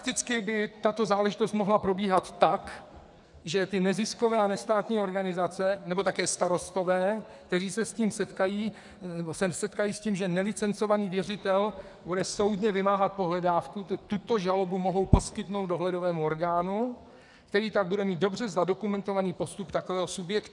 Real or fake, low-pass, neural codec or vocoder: fake; 10.8 kHz; codec, 44.1 kHz, 2.6 kbps, SNAC